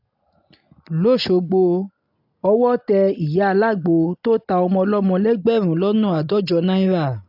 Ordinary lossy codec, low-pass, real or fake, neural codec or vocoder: AAC, 48 kbps; 5.4 kHz; real; none